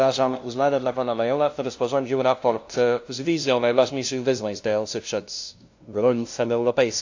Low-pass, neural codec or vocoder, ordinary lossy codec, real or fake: 7.2 kHz; codec, 16 kHz, 0.5 kbps, FunCodec, trained on LibriTTS, 25 frames a second; AAC, 48 kbps; fake